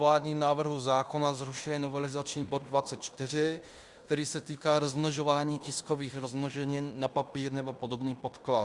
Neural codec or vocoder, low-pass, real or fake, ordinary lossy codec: codec, 16 kHz in and 24 kHz out, 0.9 kbps, LongCat-Audio-Codec, fine tuned four codebook decoder; 10.8 kHz; fake; Opus, 64 kbps